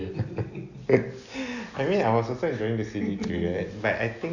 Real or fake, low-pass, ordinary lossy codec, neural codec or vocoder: real; 7.2 kHz; none; none